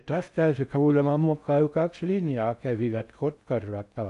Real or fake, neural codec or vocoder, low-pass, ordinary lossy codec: fake; codec, 16 kHz in and 24 kHz out, 0.6 kbps, FocalCodec, streaming, 4096 codes; 10.8 kHz; none